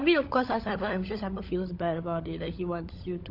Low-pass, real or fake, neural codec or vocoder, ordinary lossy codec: 5.4 kHz; fake; codec, 16 kHz, 16 kbps, FunCodec, trained on LibriTTS, 50 frames a second; none